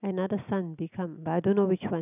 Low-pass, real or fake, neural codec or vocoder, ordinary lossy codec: 3.6 kHz; real; none; none